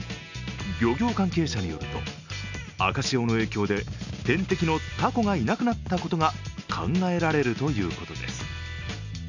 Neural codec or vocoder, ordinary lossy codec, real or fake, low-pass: none; none; real; 7.2 kHz